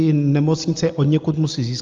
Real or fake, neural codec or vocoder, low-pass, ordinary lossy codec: real; none; 7.2 kHz; Opus, 32 kbps